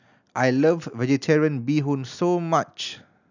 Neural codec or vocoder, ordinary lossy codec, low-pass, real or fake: none; none; 7.2 kHz; real